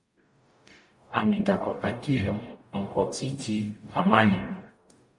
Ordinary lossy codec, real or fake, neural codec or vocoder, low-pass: MP3, 48 kbps; fake; codec, 44.1 kHz, 0.9 kbps, DAC; 10.8 kHz